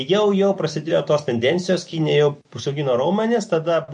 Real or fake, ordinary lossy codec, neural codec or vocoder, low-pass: real; MP3, 48 kbps; none; 10.8 kHz